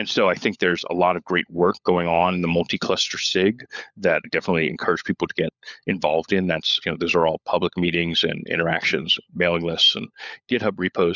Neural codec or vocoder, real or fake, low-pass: codec, 16 kHz, 16 kbps, FunCodec, trained on LibriTTS, 50 frames a second; fake; 7.2 kHz